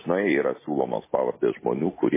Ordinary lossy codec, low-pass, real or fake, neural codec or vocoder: MP3, 16 kbps; 3.6 kHz; real; none